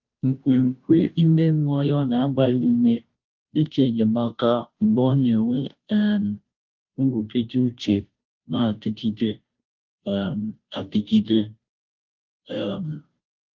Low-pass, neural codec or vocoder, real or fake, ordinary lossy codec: 7.2 kHz; codec, 16 kHz, 0.5 kbps, FunCodec, trained on Chinese and English, 25 frames a second; fake; Opus, 32 kbps